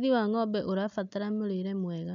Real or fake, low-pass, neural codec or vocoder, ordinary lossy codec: real; 7.2 kHz; none; none